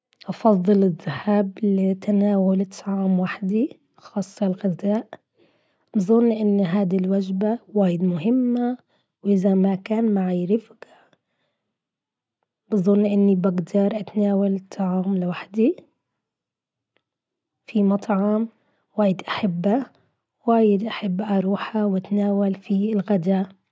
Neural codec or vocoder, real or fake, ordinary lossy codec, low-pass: none; real; none; none